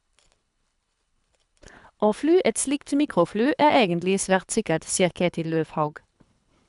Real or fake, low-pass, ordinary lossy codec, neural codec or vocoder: fake; 10.8 kHz; none; codec, 24 kHz, 3 kbps, HILCodec